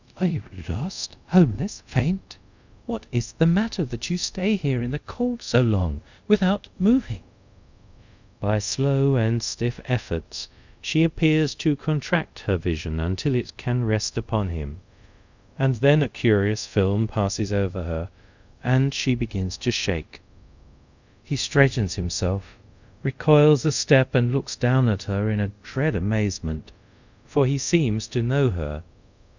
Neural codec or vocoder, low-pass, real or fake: codec, 24 kHz, 0.5 kbps, DualCodec; 7.2 kHz; fake